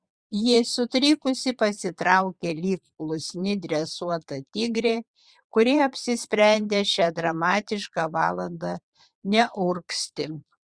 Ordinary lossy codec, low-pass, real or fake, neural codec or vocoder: Opus, 64 kbps; 9.9 kHz; fake; vocoder, 22.05 kHz, 80 mel bands, Vocos